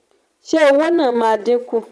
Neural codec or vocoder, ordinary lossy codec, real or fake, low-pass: vocoder, 22.05 kHz, 80 mel bands, Vocos; none; fake; none